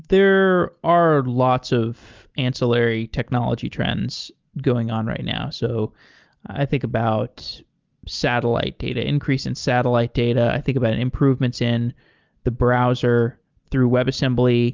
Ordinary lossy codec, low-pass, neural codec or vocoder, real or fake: Opus, 32 kbps; 7.2 kHz; none; real